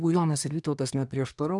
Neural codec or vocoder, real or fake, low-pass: codec, 24 kHz, 1 kbps, SNAC; fake; 10.8 kHz